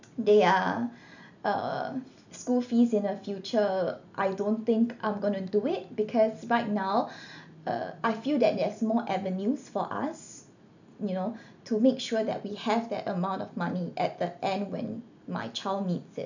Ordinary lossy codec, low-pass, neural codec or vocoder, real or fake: none; 7.2 kHz; none; real